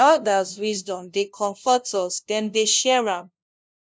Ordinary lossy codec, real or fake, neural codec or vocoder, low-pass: none; fake; codec, 16 kHz, 0.5 kbps, FunCodec, trained on LibriTTS, 25 frames a second; none